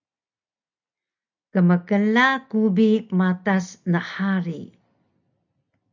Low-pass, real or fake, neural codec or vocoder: 7.2 kHz; real; none